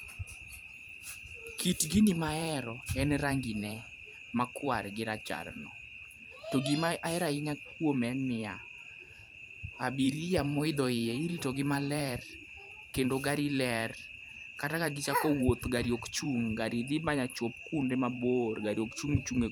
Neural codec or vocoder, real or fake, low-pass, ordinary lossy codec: vocoder, 44.1 kHz, 128 mel bands every 512 samples, BigVGAN v2; fake; none; none